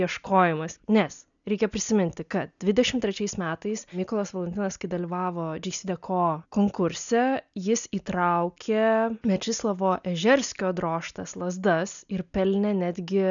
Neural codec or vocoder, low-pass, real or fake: none; 7.2 kHz; real